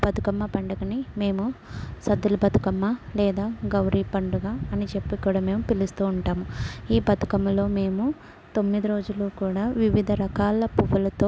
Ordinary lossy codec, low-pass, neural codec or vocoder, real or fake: none; none; none; real